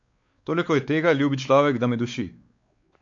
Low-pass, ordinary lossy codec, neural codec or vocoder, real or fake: 7.2 kHz; MP3, 48 kbps; codec, 16 kHz, 4 kbps, X-Codec, WavLM features, trained on Multilingual LibriSpeech; fake